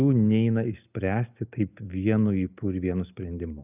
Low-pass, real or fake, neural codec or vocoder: 3.6 kHz; real; none